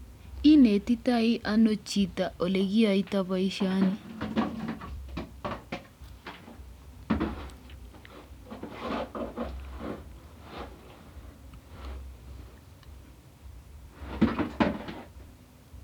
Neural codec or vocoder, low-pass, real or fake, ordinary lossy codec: vocoder, 44.1 kHz, 128 mel bands every 256 samples, BigVGAN v2; 19.8 kHz; fake; none